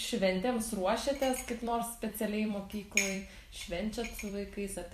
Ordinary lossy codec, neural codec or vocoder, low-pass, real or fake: MP3, 64 kbps; vocoder, 44.1 kHz, 128 mel bands every 256 samples, BigVGAN v2; 14.4 kHz; fake